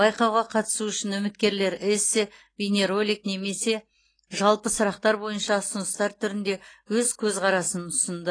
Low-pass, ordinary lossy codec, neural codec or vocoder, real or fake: 9.9 kHz; AAC, 32 kbps; none; real